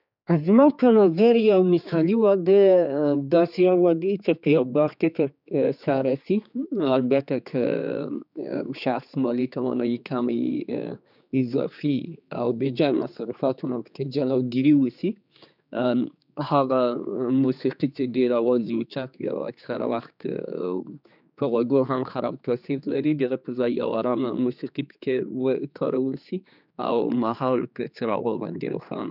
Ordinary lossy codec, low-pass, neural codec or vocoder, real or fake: none; 5.4 kHz; codec, 16 kHz, 4 kbps, X-Codec, HuBERT features, trained on general audio; fake